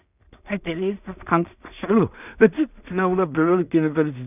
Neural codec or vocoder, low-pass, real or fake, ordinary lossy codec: codec, 16 kHz in and 24 kHz out, 0.4 kbps, LongCat-Audio-Codec, two codebook decoder; 3.6 kHz; fake; none